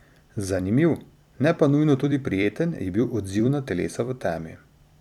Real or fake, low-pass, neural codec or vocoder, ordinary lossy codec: fake; 19.8 kHz; vocoder, 44.1 kHz, 128 mel bands every 256 samples, BigVGAN v2; none